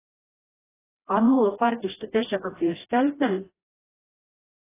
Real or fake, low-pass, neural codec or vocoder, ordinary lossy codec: fake; 3.6 kHz; codec, 16 kHz, 1 kbps, FreqCodec, smaller model; AAC, 16 kbps